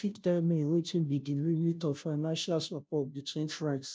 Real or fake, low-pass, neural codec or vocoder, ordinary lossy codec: fake; none; codec, 16 kHz, 0.5 kbps, FunCodec, trained on Chinese and English, 25 frames a second; none